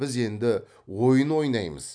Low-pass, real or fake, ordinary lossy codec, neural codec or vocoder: 9.9 kHz; real; none; none